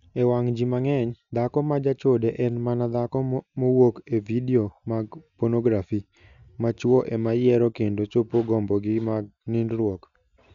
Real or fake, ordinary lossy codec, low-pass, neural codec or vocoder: real; none; 7.2 kHz; none